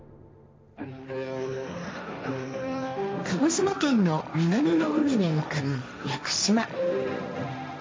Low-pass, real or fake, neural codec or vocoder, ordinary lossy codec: none; fake; codec, 16 kHz, 1.1 kbps, Voila-Tokenizer; none